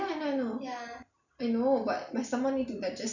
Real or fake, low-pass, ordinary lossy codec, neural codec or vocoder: real; 7.2 kHz; none; none